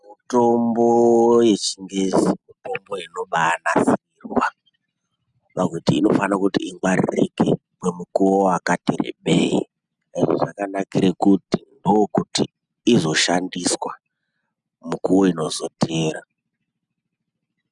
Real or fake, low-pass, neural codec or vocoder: real; 10.8 kHz; none